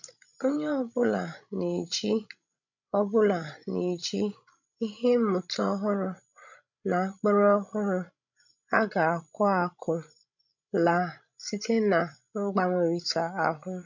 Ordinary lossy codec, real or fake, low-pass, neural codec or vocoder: none; fake; 7.2 kHz; codec, 16 kHz, 16 kbps, FreqCodec, larger model